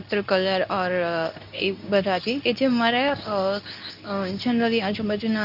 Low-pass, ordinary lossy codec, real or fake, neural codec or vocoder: 5.4 kHz; none; fake; codec, 24 kHz, 0.9 kbps, WavTokenizer, medium speech release version 2